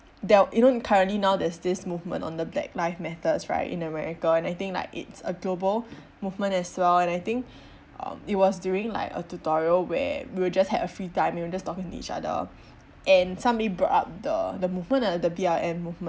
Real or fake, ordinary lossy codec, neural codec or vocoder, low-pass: real; none; none; none